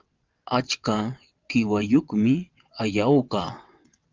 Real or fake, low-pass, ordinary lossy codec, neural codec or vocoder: fake; 7.2 kHz; Opus, 32 kbps; vocoder, 22.05 kHz, 80 mel bands, WaveNeXt